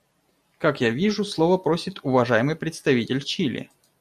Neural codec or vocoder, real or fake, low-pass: none; real; 14.4 kHz